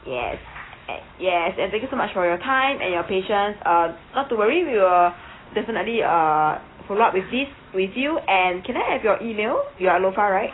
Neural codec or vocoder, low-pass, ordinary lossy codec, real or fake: none; 7.2 kHz; AAC, 16 kbps; real